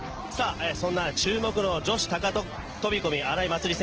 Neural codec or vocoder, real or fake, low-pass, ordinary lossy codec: none; real; 7.2 kHz; Opus, 16 kbps